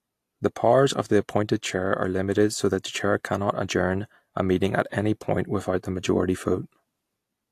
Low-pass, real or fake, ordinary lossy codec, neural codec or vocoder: 14.4 kHz; real; AAC, 64 kbps; none